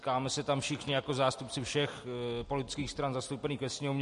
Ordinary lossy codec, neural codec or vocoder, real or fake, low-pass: MP3, 48 kbps; none; real; 14.4 kHz